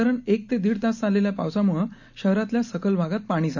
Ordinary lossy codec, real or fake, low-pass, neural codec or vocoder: none; real; 7.2 kHz; none